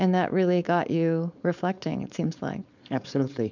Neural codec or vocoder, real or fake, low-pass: codec, 16 kHz, 4.8 kbps, FACodec; fake; 7.2 kHz